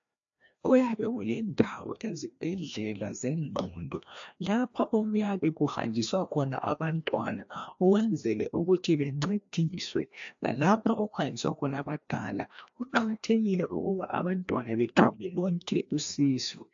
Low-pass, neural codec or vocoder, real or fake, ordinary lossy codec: 7.2 kHz; codec, 16 kHz, 1 kbps, FreqCodec, larger model; fake; AAC, 64 kbps